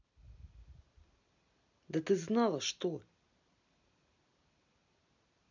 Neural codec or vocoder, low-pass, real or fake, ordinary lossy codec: none; 7.2 kHz; real; none